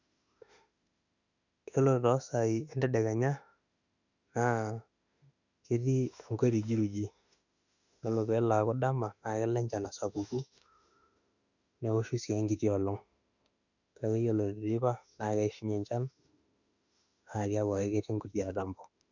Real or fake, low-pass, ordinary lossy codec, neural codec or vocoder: fake; 7.2 kHz; none; autoencoder, 48 kHz, 32 numbers a frame, DAC-VAE, trained on Japanese speech